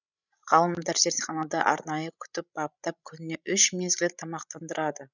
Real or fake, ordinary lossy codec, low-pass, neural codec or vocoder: fake; none; 7.2 kHz; codec, 16 kHz, 16 kbps, FreqCodec, larger model